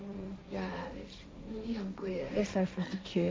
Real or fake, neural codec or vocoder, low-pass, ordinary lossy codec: fake; codec, 16 kHz, 1.1 kbps, Voila-Tokenizer; none; none